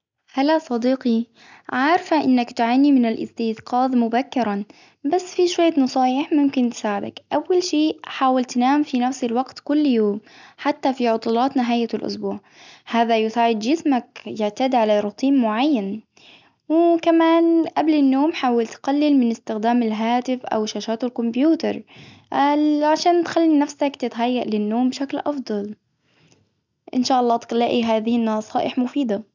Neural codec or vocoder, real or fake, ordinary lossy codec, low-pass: none; real; none; 7.2 kHz